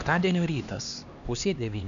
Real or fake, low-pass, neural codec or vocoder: fake; 7.2 kHz; codec, 16 kHz, 2 kbps, X-Codec, HuBERT features, trained on LibriSpeech